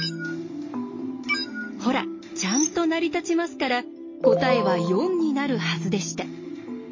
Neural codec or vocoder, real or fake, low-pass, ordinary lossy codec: none; real; 7.2 kHz; MP3, 32 kbps